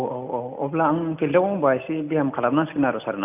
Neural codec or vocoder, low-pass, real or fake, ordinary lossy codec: none; 3.6 kHz; real; none